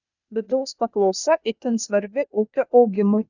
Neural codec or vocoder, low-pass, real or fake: codec, 16 kHz, 0.8 kbps, ZipCodec; 7.2 kHz; fake